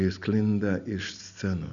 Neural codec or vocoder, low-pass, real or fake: none; 7.2 kHz; real